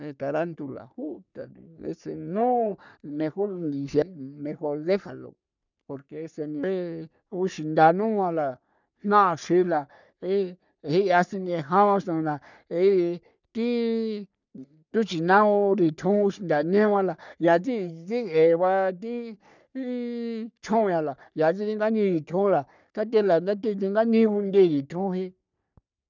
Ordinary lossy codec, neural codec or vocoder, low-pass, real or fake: none; codec, 44.1 kHz, 3.4 kbps, Pupu-Codec; 7.2 kHz; fake